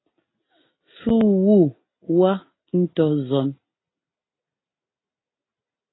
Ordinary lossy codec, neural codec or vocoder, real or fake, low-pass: AAC, 16 kbps; none; real; 7.2 kHz